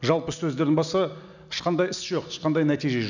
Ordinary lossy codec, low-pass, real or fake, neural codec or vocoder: none; 7.2 kHz; real; none